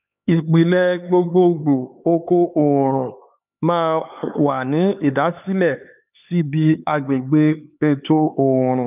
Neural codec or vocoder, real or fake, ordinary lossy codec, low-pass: codec, 16 kHz, 4 kbps, X-Codec, HuBERT features, trained on LibriSpeech; fake; none; 3.6 kHz